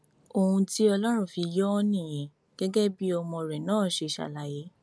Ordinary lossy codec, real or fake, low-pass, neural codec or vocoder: none; real; none; none